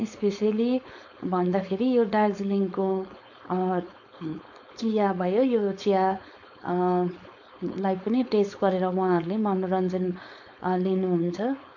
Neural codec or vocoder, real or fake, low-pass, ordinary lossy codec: codec, 16 kHz, 4.8 kbps, FACodec; fake; 7.2 kHz; none